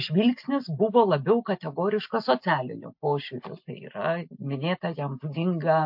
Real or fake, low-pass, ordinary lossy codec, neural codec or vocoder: real; 5.4 kHz; MP3, 48 kbps; none